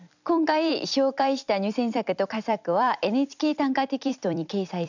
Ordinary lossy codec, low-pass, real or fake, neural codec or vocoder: none; 7.2 kHz; fake; vocoder, 44.1 kHz, 128 mel bands every 256 samples, BigVGAN v2